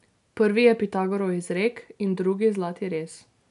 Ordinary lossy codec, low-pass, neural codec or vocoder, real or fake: none; 10.8 kHz; none; real